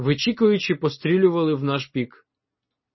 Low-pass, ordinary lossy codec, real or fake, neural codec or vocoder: 7.2 kHz; MP3, 24 kbps; real; none